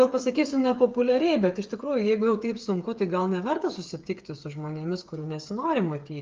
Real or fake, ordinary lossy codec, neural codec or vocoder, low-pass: fake; Opus, 32 kbps; codec, 16 kHz, 8 kbps, FreqCodec, smaller model; 7.2 kHz